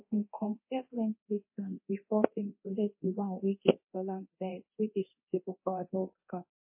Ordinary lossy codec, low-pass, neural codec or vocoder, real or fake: MP3, 24 kbps; 3.6 kHz; codec, 24 kHz, 0.9 kbps, DualCodec; fake